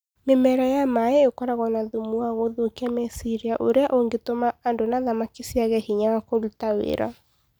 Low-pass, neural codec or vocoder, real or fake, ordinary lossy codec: none; none; real; none